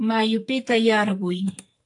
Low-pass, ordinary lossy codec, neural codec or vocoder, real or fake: 10.8 kHz; AAC, 64 kbps; codec, 44.1 kHz, 2.6 kbps, SNAC; fake